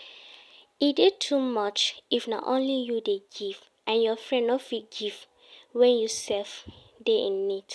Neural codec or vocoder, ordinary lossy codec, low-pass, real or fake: none; none; none; real